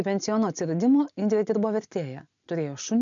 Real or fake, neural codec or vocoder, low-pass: real; none; 7.2 kHz